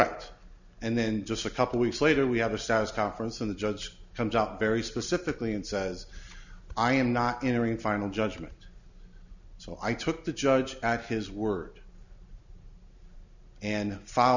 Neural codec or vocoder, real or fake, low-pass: none; real; 7.2 kHz